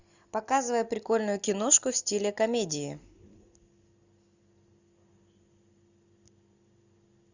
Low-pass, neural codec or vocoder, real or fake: 7.2 kHz; none; real